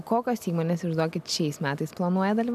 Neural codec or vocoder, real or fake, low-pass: none; real; 14.4 kHz